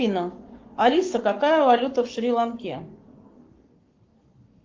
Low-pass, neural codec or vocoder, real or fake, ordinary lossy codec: 7.2 kHz; codec, 44.1 kHz, 7.8 kbps, Pupu-Codec; fake; Opus, 24 kbps